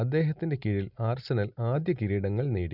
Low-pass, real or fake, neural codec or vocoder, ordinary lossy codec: 5.4 kHz; real; none; none